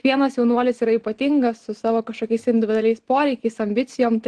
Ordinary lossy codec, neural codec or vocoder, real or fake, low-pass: Opus, 16 kbps; none; real; 9.9 kHz